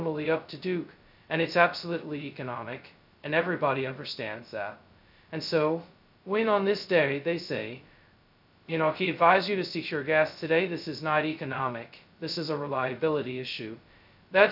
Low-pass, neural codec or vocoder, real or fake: 5.4 kHz; codec, 16 kHz, 0.2 kbps, FocalCodec; fake